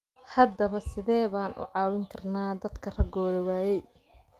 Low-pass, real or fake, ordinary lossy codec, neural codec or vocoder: 14.4 kHz; fake; Opus, 32 kbps; autoencoder, 48 kHz, 128 numbers a frame, DAC-VAE, trained on Japanese speech